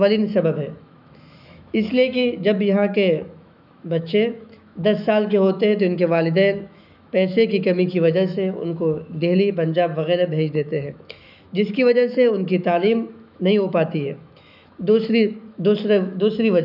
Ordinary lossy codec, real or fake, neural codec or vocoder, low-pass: none; fake; autoencoder, 48 kHz, 128 numbers a frame, DAC-VAE, trained on Japanese speech; 5.4 kHz